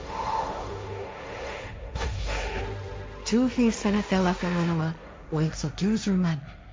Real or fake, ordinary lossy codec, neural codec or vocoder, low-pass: fake; none; codec, 16 kHz, 1.1 kbps, Voila-Tokenizer; none